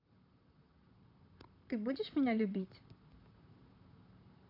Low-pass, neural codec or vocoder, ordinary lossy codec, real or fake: 5.4 kHz; vocoder, 44.1 kHz, 128 mel bands, Pupu-Vocoder; none; fake